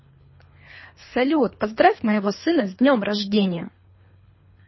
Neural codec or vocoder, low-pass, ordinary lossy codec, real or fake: codec, 24 kHz, 3 kbps, HILCodec; 7.2 kHz; MP3, 24 kbps; fake